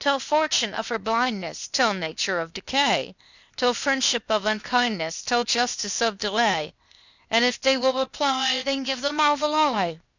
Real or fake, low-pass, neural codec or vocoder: fake; 7.2 kHz; codec, 16 kHz, 0.8 kbps, ZipCodec